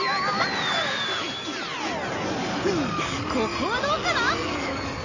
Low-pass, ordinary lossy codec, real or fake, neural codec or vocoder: 7.2 kHz; none; real; none